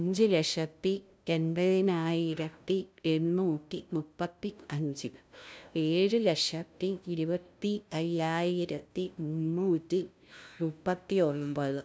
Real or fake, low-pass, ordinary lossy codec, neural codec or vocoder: fake; none; none; codec, 16 kHz, 0.5 kbps, FunCodec, trained on LibriTTS, 25 frames a second